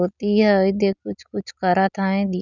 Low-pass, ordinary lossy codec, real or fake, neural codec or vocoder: 7.2 kHz; none; real; none